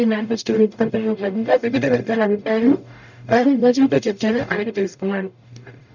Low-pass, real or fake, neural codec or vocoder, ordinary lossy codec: 7.2 kHz; fake; codec, 44.1 kHz, 0.9 kbps, DAC; none